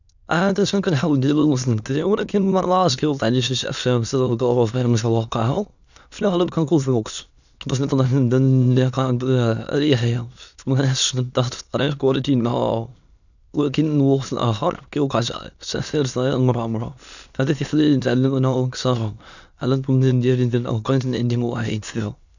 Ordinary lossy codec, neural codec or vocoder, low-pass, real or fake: none; autoencoder, 22.05 kHz, a latent of 192 numbers a frame, VITS, trained on many speakers; 7.2 kHz; fake